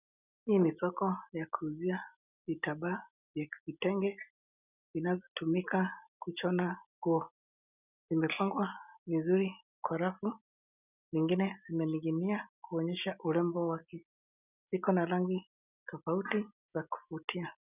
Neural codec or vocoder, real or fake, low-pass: none; real; 3.6 kHz